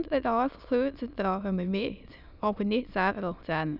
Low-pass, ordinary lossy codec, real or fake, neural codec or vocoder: 5.4 kHz; none; fake; autoencoder, 22.05 kHz, a latent of 192 numbers a frame, VITS, trained on many speakers